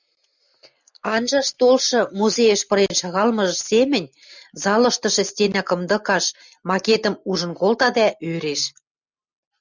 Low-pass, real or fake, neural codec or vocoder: 7.2 kHz; real; none